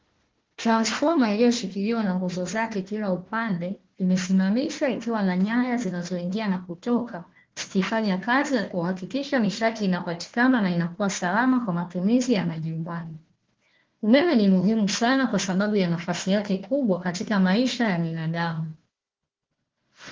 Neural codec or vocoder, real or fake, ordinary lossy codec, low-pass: codec, 16 kHz, 1 kbps, FunCodec, trained on Chinese and English, 50 frames a second; fake; Opus, 16 kbps; 7.2 kHz